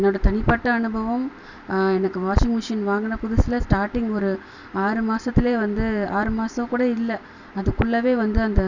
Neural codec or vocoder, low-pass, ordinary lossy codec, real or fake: none; 7.2 kHz; none; real